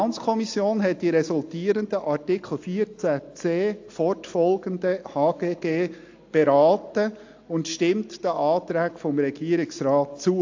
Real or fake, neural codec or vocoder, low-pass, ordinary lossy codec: real; none; 7.2 kHz; AAC, 48 kbps